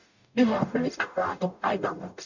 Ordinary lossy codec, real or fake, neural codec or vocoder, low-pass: none; fake; codec, 44.1 kHz, 0.9 kbps, DAC; 7.2 kHz